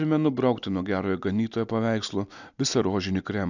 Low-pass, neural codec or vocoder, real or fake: 7.2 kHz; none; real